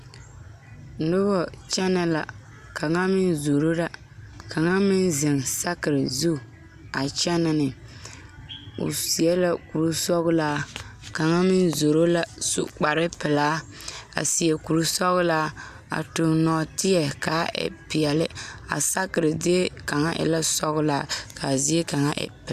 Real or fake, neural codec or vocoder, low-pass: real; none; 14.4 kHz